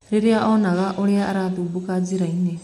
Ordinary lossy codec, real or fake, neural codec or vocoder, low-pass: MP3, 64 kbps; real; none; 14.4 kHz